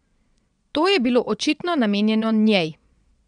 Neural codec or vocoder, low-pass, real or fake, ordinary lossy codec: vocoder, 22.05 kHz, 80 mel bands, Vocos; 9.9 kHz; fake; none